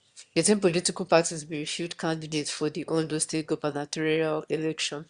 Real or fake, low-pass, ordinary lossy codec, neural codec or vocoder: fake; 9.9 kHz; Opus, 64 kbps; autoencoder, 22.05 kHz, a latent of 192 numbers a frame, VITS, trained on one speaker